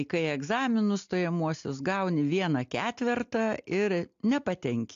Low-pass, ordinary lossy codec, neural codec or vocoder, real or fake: 7.2 kHz; AAC, 64 kbps; none; real